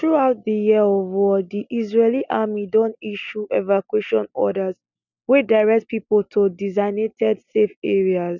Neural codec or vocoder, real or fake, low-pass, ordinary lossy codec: none; real; 7.2 kHz; none